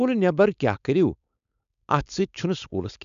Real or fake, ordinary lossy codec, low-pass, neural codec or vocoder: fake; MP3, 96 kbps; 7.2 kHz; codec, 16 kHz, 4.8 kbps, FACodec